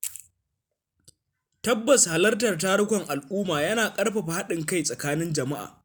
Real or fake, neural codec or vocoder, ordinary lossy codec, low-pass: real; none; none; none